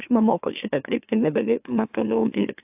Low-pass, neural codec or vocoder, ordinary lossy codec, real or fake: 3.6 kHz; autoencoder, 44.1 kHz, a latent of 192 numbers a frame, MeloTTS; AAC, 32 kbps; fake